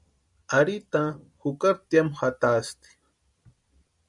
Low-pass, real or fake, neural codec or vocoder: 10.8 kHz; real; none